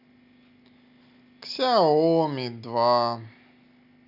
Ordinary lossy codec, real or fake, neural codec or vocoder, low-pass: none; real; none; 5.4 kHz